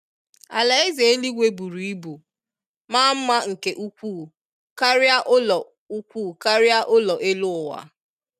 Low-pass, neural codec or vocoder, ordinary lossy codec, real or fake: 14.4 kHz; none; none; real